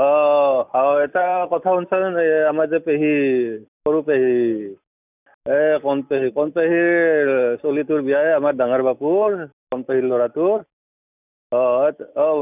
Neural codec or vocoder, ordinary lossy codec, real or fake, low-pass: none; none; real; 3.6 kHz